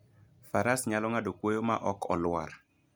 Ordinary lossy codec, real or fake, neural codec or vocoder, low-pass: none; real; none; none